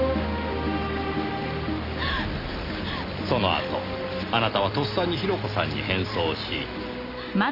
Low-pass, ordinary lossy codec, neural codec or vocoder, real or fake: 5.4 kHz; Opus, 64 kbps; none; real